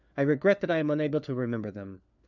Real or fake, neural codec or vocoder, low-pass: fake; codec, 16 kHz, 4 kbps, FunCodec, trained on LibriTTS, 50 frames a second; 7.2 kHz